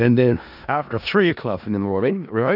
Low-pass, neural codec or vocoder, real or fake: 5.4 kHz; codec, 16 kHz in and 24 kHz out, 0.4 kbps, LongCat-Audio-Codec, four codebook decoder; fake